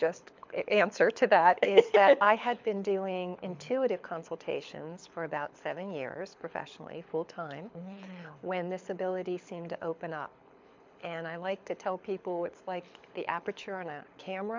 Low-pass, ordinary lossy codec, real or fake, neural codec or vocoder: 7.2 kHz; MP3, 64 kbps; fake; codec, 24 kHz, 6 kbps, HILCodec